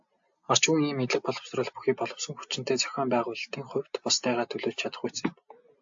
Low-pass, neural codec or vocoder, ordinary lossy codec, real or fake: 7.2 kHz; none; AAC, 64 kbps; real